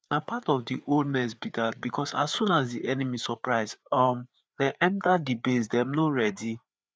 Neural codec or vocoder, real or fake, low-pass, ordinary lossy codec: codec, 16 kHz, 4 kbps, FreqCodec, larger model; fake; none; none